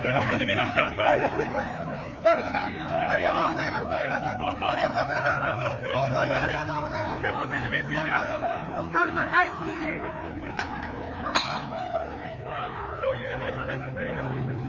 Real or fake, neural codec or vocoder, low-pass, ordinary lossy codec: fake; codec, 16 kHz, 2 kbps, FreqCodec, larger model; 7.2 kHz; none